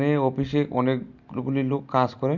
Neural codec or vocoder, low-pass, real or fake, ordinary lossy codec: none; 7.2 kHz; real; none